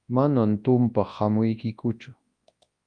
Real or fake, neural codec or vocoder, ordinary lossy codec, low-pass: fake; codec, 24 kHz, 0.9 kbps, WavTokenizer, large speech release; Opus, 32 kbps; 9.9 kHz